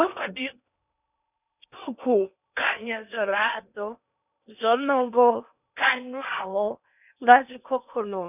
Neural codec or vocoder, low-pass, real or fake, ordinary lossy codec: codec, 16 kHz in and 24 kHz out, 0.8 kbps, FocalCodec, streaming, 65536 codes; 3.6 kHz; fake; none